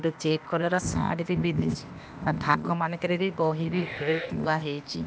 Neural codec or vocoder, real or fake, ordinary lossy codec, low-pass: codec, 16 kHz, 0.8 kbps, ZipCodec; fake; none; none